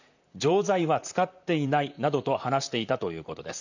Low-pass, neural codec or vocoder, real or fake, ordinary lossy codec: 7.2 kHz; vocoder, 44.1 kHz, 128 mel bands, Pupu-Vocoder; fake; none